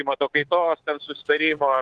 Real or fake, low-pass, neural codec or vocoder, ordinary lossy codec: fake; 10.8 kHz; codec, 24 kHz, 3.1 kbps, DualCodec; Opus, 16 kbps